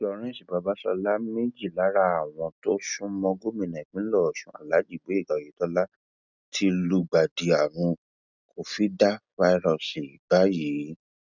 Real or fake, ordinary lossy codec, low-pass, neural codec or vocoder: real; none; 7.2 kHz; none